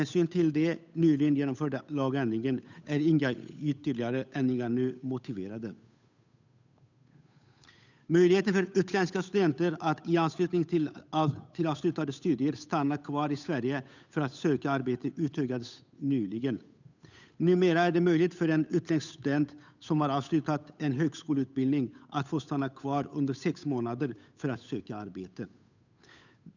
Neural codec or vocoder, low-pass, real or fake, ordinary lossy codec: codec, 16 kHz, 8 kbps, FunCodec, trained on Chinese and English, 25 frames a second; 7.2 kHz; fake; none